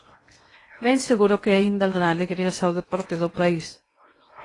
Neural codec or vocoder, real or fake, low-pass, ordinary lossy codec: codec, 16 kHz in and 24 kHz out, 0.8 kbps, FocalCodec, streaming, 65536 codes; fake; 10.8 kHz; AAC, 32 kbps